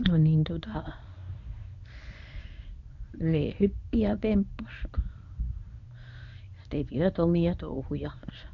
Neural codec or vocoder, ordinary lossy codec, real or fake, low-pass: codec, 24 kHz, 0.9 kbps, WavTokenizer, medium speech release version 1; none; fake; 7.2 kHz